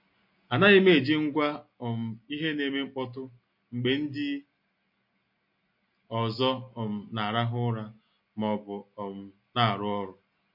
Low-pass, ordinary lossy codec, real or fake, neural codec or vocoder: 5.4 kHz; MP3, 32 kbps; real; none